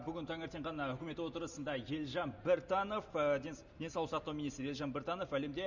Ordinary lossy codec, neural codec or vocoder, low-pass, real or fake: none; none; 7.2 kHz; real